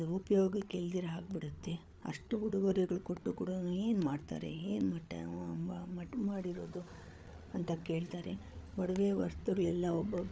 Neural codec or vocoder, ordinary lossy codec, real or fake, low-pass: codec, 16 kHz, 8 kbps, FreqCodec, larger model; none; fake; none